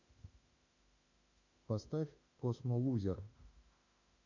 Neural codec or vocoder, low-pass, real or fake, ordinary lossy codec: autoencoder, 48 kHz, 32 numbers a frame, DAC-VAE, trained on Japanese speech; 7.2 kHz; fake; AAC, 48 kbps